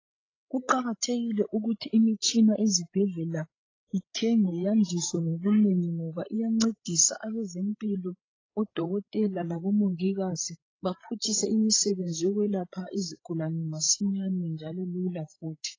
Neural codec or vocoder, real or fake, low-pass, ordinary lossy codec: codec, 16 kHz, 16 kbps, FreqCodec, larger model; fake; 7.2 kHz; AAC, 32 kbps